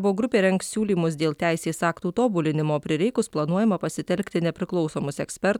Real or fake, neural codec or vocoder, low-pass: real; none; 19.8 kHz